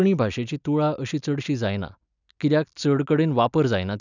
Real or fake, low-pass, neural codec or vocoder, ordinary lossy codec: real; 7.2 kHz; none; none